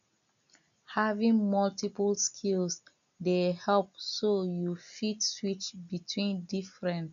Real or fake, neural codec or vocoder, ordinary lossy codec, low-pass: real; none; none; 7.2 kHz